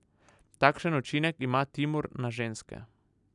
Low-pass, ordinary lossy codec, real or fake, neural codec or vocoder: 10.8 kHz; none; real; none